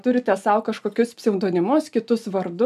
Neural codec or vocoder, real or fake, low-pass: none; real; 14.4 kHz